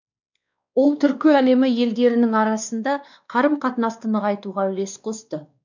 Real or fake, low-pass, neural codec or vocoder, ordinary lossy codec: fake; 7.2 kHz; codec, 16 kHz, 2 kbps, X-Codec, WavLM features, trained on Multilingual LibriSpeech; none